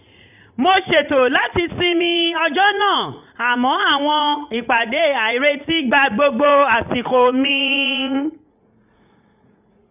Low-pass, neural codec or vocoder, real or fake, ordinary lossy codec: 3.6 kHz; vocoder, 44.1 kHz, 80 mel bands, Vocos; fake; none